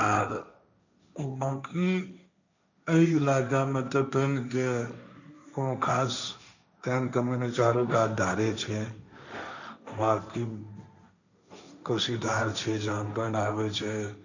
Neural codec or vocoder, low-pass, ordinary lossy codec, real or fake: codec, 16 kHz, 1.1 kbps, Voila-Tokenizer; 7.2 kHz; none; fake